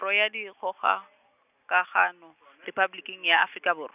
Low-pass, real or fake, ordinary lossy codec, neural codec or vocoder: 3.6 kHz; real; none; none